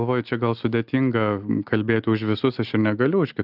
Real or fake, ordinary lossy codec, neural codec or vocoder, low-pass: real; Opus, 24 kbps; none; 5.4 kHz